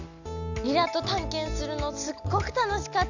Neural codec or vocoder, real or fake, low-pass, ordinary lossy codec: none; real; 7.2 kHz; none